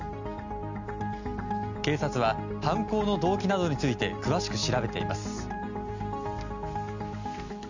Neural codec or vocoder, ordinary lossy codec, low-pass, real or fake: none; none; 7.2 kHz; real